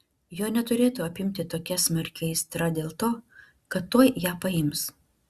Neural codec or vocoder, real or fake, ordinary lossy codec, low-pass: none; real; Opus, 64 kbps; 14.4 kHz